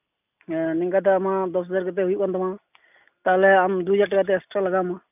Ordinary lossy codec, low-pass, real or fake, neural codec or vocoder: none; 3.6 kHz; real; none